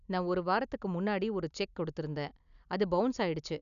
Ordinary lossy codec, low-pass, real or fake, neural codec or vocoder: none; 7.2 kHz; real; none